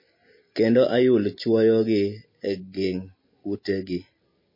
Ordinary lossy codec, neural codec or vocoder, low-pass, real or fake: MP3, 24 kbps; none; 5.4 kHz; real